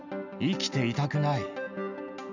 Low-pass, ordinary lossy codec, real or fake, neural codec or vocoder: 7.2 kHz; none; real; none